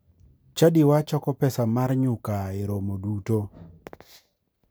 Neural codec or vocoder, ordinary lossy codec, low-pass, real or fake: none; none; none; real